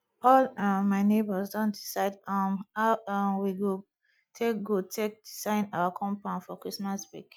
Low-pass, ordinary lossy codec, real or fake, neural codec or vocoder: none; none; real; none